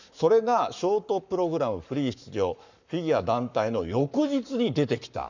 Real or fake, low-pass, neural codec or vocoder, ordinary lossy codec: fake; 7.2 kHz; codec, 44.1 kHz, 7.8 kbps, Pupu-Codec; none